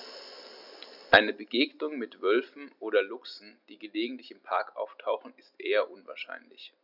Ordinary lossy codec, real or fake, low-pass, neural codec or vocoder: none; real; 5.4 kHz; none